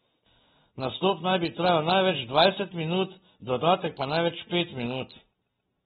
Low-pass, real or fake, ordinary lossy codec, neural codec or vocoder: 19.8 kHz; real; AAC, 16 kbps; none